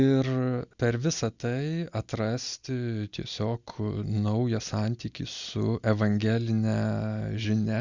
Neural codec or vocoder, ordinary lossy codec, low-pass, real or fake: none; Opus, 64 kbps; 7.2 kHz; real